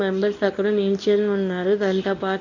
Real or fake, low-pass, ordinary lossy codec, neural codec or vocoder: fake; 7.2 kHz; none; codec, 24 kHz, 0.9 kbps, WavTokenizer, medium speech release version 1